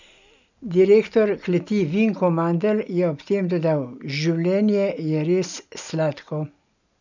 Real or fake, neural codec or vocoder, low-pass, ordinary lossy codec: real; none; 7.2 kHz; none